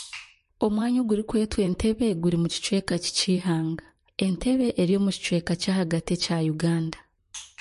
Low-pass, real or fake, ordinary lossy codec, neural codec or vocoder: 14.4 kHz; fake; MP3, 48 kbps; vocoder, 44.1 kHz, 128 mel bands, Pupu-Vocoder